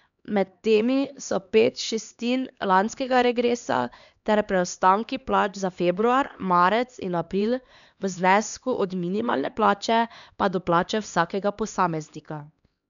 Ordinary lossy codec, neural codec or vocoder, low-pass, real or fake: none; codec, 16 kHz, 2 kbps, X-Codec, HuBERT features, trained on LibriSpeech; 7.2 kHz; fake